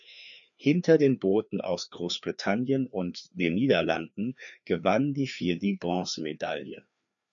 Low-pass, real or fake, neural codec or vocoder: 7.2 kHz; fake; codec, 16 kHz, 2 kbps, FreqCodec, larger model